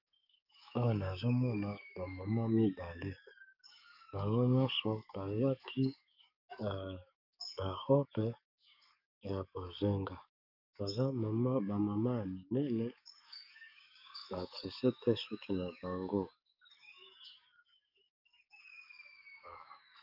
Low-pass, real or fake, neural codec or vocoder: 5.4 kHz; fake; codec, 44.1 kHz, 7.8 kbps, DAC